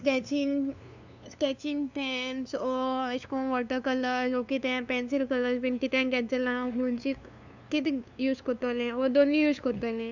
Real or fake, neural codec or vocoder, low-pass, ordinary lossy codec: fake; codec, 16 kHz, 2 kbps, FunCodec, trained on LibriTTS, 25 frames a second; 7.2 kHz; none